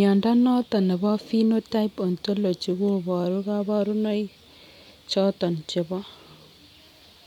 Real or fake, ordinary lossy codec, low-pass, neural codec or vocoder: real; none; 19.8 kHz; none